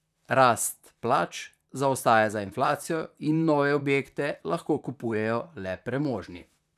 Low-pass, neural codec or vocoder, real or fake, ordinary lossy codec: 14.4 kHz; vocoder, 44.1 kHz, 128 mel bands, Pupu-Vocoder; fake; none